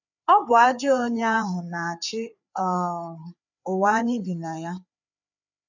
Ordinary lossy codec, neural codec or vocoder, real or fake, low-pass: none; codec, 16 kHz, 4 kbps, FreqCodec, larger model; fake; 7.2 kHz